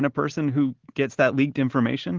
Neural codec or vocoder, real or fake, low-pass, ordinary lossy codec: none; real; 7.2 kHz; Opus, 16 kbps